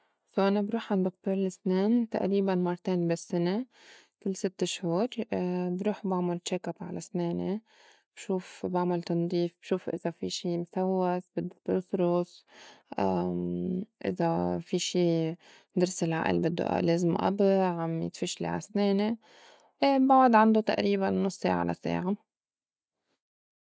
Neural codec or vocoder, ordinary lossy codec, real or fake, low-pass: none; none; real; none